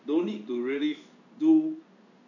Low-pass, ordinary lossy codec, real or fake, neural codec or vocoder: 7.2 kHz; none; fake; codec, 16 kHz in and 24 kHz out, 1 kbps, XY-Tokenizer